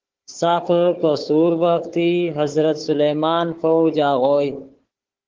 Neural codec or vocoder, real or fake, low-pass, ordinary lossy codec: codec, 16 kHz, 4 kbps, FunCodec, trained on Chinese and English, 50 frames a second; fake; 7.2 kHz; Opus, 16 kbps